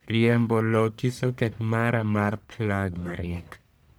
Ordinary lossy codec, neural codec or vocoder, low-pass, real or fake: none; codec, 44.1 kHz, 1.7 kbps, Pupu-Codec; none; fake